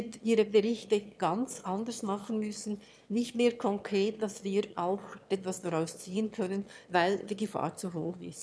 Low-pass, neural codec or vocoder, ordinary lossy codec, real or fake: none; autoencoder, 22.05 kHz, a latent of 192 numbers a frame, VITS, trained on one speaker; none; fake